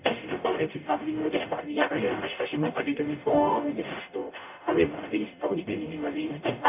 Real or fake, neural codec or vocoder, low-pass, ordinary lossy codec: fake; codec, 44.1 kHz, 0.9 kbps, DAC; 3.6 kHz; none